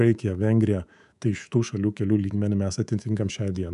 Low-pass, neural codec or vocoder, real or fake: 10.8 kHz; codec, 24 kHz, 3.1 kbps, DualCodec; fake